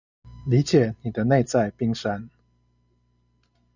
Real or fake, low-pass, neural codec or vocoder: real; 7.2 kHz; none